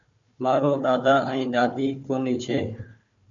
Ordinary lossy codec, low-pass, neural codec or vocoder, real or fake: MP3, 64 kbps; 7.2 kHz; codec, 16 kHz, 4 kbps, FunCodec, trained on Chinese and English, 50 frames a second; fake